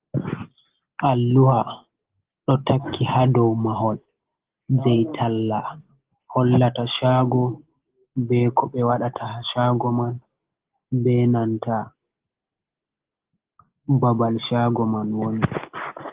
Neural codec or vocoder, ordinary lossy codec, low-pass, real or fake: none; Opus, 16 kbps; 3.6 kHz; real